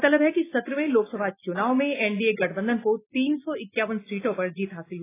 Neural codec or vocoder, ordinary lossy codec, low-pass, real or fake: none; AAC, 16 kbps; 3.6 kHz; real